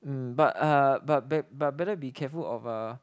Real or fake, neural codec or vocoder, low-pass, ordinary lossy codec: real; none; none; none